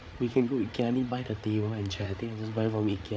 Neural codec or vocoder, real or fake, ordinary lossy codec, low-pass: codec, 16 kHz, 8 kbps, FreqCodec, larger model; fake; none; none